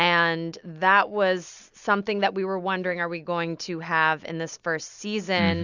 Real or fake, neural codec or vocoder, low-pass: real; none; 7.2 kHz